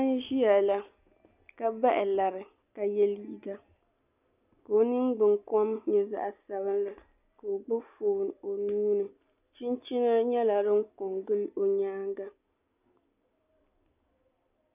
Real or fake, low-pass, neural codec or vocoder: real; 3.6 kHz; none